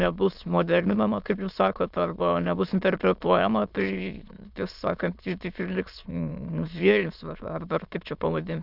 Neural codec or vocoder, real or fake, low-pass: autoencoder, 22.05 kHz, a latent of 192 numbers a frame, VITS, trained on many speakers; fake; 5.4 kHz